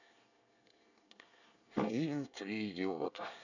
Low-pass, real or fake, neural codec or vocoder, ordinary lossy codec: 7.2 kHz; fake; codec, 24 kHz, 1 kbps, SNAC; none